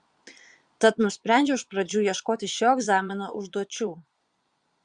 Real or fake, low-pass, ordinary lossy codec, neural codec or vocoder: fake; 9.9 kHz; Opus, 64 kbps; vocoder, 22.05 kHz, 80 mel bands, Vocos